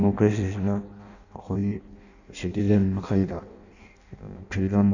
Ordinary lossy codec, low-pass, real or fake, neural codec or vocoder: none; 7.2 kHz; fake; codec, 16 kHz in and 24 kHz out, 0.6 kbps, FireRedTTS-2 codec